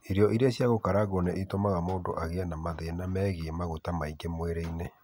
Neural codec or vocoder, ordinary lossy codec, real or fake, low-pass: none; none; real; none